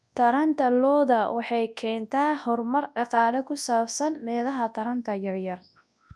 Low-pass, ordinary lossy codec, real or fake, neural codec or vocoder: none; none; fake; codec, 24 kHz, 0.9 kbps, WavTokenizer, large speech release